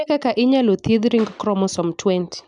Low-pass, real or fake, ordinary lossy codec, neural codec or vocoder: 9.9 kHz; real; none; none